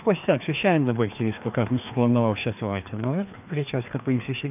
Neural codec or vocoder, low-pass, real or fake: codec, 16 kHz, 2 kbps, FreqCodec, larger model; 3.6 kHz; fake